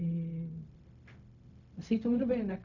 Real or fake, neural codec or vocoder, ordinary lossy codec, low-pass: fake; codec, 16 kHz, 0.4 kbps, LongCat-Audio-Codec; none; 7.2 kHz